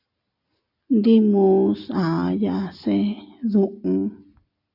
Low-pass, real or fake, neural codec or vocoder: 5.4 kHz; real; none